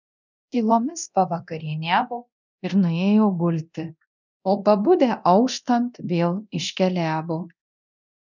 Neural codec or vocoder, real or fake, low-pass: codec, 24 kHz, 0.9 kbps, DualCodec; fake; 7.2 kHz